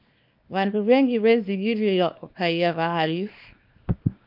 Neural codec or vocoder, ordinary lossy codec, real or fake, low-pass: codec, 24 kHz, 0.9 kbps, WavTokenizer, small release; MP3, 48 kbps; fake; 5.4 kHz